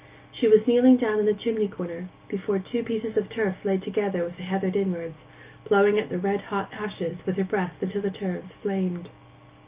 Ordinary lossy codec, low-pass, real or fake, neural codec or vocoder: Opus, 24 kbps; 3.6 kHz; real; none